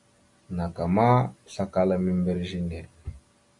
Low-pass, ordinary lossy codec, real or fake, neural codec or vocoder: 10.8 kHz; AAC, 64 kbps; real; none